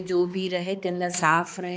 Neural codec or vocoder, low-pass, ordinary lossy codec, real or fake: codec, 16 kHz, 2 kbps, X-Codec, HuBERT features, trained on balanced general audio; none; none; fake